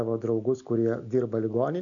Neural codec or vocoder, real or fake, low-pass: none; real; 7.2 kHz